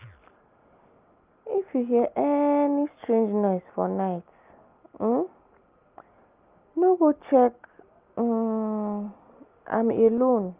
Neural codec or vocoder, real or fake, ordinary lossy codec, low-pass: none; real; Opus, 24 kbps; 3.6 kHz